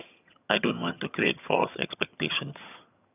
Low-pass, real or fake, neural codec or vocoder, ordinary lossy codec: 3.6 kHz; fake; vocoder, 22.05 kHz, 80 mel bands, HiFi-GAN; none